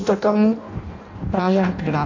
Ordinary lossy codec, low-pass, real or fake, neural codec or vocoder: AAC, 48 kbps; 7.2 kHz; fake; codec, 16 kHz in and 24 kHz out, 0.6 kbps, FireRedTTS-2 codec